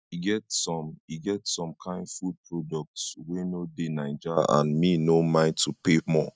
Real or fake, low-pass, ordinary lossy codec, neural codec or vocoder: real; none; none; none